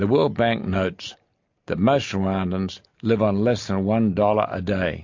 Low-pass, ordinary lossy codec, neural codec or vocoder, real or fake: 7.2 kHz; MP3, 48 kbps; none; real